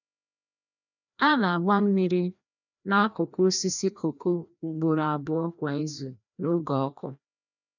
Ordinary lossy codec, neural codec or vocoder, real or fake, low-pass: none; codec, 16 kHz, 1 kbps, FreqCodec, larger model; fake; 7.2 kHz